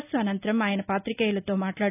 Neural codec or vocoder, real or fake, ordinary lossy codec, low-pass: none; real; none; 3.6 kHz